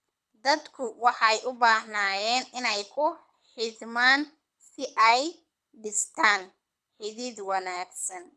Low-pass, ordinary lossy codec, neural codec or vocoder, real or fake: none; none; codec, 24 kHz, 6 kbps, HILCodec; fake